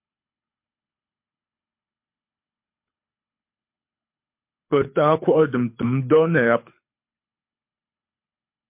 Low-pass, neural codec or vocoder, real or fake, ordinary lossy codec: 3.6 kHz; codec, 24 kHz, 6 kbps, HILCodec; fake; MP3, 32 kbps